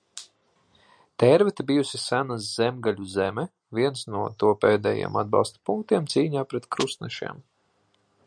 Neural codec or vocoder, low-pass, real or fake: none; 9.9 kHz; real